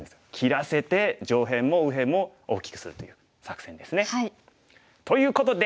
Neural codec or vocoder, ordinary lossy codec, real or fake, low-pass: none; none; real; none